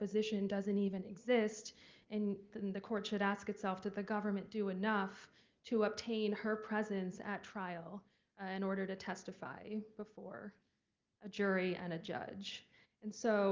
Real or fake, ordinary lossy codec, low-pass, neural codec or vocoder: real; Opus, 32 kbps; 7.2 kHz; none